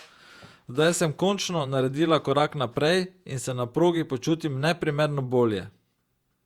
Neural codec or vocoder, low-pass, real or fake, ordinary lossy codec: vocoder, 48 kHz, 128 mel bands, Vocos; 19.8 kHz; fake; Opus, 64 kbps